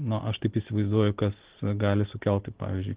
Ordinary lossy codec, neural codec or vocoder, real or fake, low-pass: Opus, 16 kbps; none; real; 3.6 kHz